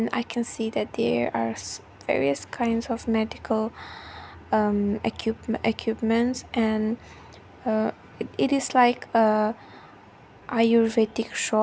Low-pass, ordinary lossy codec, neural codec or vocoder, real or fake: none; none; none; real